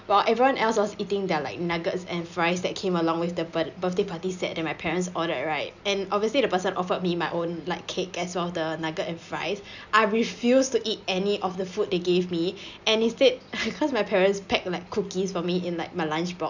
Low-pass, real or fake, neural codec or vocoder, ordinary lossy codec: 7.2 kHz; real; none; none